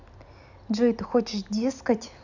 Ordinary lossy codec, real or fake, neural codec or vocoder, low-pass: none; real; none; 7.2 kHz